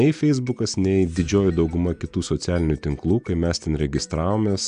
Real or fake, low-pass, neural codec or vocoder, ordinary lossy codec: real; 9.9 kHz; none; AAC, 96 kbps